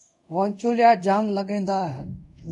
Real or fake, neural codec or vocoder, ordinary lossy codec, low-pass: fake; codec, 24 kHz, 0.5 kbps, DualCodec; MP3, 96 kbps; 10.8 kHz